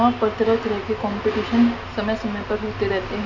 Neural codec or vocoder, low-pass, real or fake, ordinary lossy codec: none; 7.2 kHz; real; AAC, 48 kbps